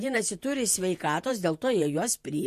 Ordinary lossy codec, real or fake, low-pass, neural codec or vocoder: MP3, 64 kbps; fake; 14.4 kHz; vocoder, 48 kHz, 128 mel bands, Vocos